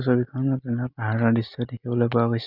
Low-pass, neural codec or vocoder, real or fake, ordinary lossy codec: 5.4 kHz; none; real; none